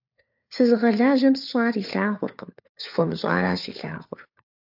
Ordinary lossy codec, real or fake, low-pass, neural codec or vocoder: AAC, 48 kbps; fake; 5.4 kHz; codec, 16 kHz, 4 kbps, FunCodec, trained on LibriTTS, 50 frames a second